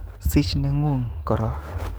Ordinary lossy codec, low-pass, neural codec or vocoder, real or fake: none; none; codec, 44.1 kHz, 7.8 kbps, DAC; fake